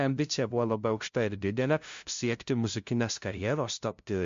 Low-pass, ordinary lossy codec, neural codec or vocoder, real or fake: 7.2 kHz; MP3, 48 kbps; codec, 16 kHz, 0.5 kbps, FunCodec, trained on LibriTTS, 25 frames a second; fake